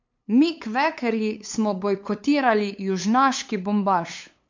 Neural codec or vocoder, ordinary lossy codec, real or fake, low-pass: codec, 16 kHz, 8 kbps, FunCodec, trained on LibriTTS, 25 frames a second; MP3, 48 kbps; fake; 7.2 kHz